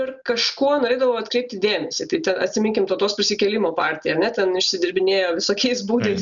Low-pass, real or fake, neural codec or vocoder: 7.2 kHz; real; none